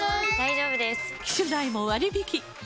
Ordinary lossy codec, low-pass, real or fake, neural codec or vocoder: none; none; real; none